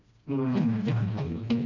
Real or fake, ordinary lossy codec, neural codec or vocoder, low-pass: fake; none; codec, 16 kHz, 1 kbps, FreqCodec, smaller model; 7.2 kHz